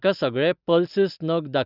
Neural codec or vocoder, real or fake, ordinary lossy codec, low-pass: none; real; Opus, 64 kbps; 5.4 kHz